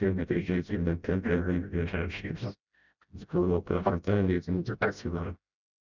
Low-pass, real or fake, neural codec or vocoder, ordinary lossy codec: 7.2 kHz; fake; codec, 16 kHz, 0.5 kbps, FreqCodec, smaller model; none